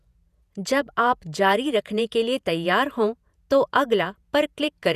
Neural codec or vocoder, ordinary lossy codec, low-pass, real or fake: vocoder, 48 kHz, 128 mel bands, Vocos; none; 14.4 kHz; fake